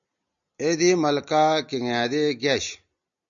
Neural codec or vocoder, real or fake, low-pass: none; real; 7.2 kHz